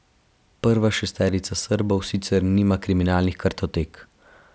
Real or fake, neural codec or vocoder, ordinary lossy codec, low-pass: real; none; none; none